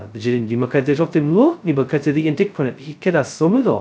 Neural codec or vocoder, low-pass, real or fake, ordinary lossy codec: codec, 16 kHz, 0.2 kbps, FocalCodec; none; fake; none